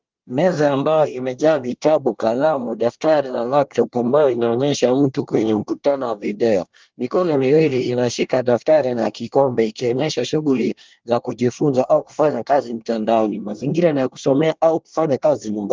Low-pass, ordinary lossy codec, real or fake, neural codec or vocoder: 7.2 kHz; Opus, 32 kbps; fake; codec, 24 kHz, 1 kbps, SNAC